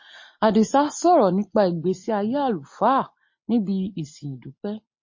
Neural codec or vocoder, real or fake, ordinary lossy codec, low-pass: none; real; MP3, 32 kbps; 7.2 kHz